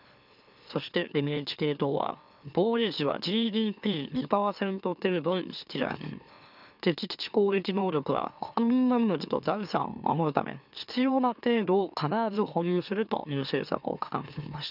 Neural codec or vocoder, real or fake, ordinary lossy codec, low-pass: autoencoder, 44.1 kHz, a latent of 192 numbers a frame, MeloTTS; fake; none; 5.4 kHz